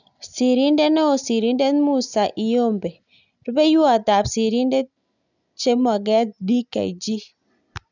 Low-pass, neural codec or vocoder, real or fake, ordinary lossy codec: 7.2 kHz; none; real; none